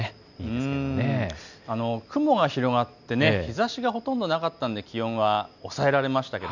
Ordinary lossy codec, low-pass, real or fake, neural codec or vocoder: none; 7.2 kHz; real; none